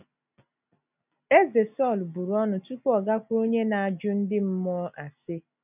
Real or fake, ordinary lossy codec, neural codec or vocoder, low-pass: real; none; none; 3.6 kHz